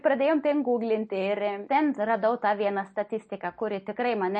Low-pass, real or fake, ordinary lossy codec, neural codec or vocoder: 10.8 kHz; real; MP3, 32 kbps; none